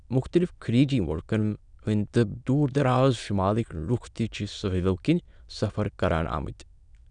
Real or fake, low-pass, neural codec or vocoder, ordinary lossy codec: fake; 9.9 kHz; autoencoder, 22.05 kHz, a latent of 192 numbers a frame, VITS, trained on many speakers; none